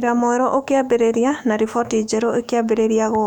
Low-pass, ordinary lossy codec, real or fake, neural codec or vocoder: 19.8 kHz; none; fake; autoencoder, 48 kHz, 128 numbers a frame, DAC-VAE, trained on Japanese speech